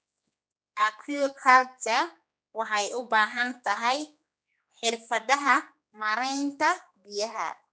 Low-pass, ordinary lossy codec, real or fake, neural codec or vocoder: none; none; fake; codec, 16 kHz, 2 kbps, X-Codec, HuBERT features, trained on general audio